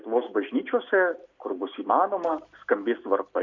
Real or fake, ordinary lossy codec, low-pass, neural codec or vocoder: real; Opus, 64 kbps; 7.2 kHz; none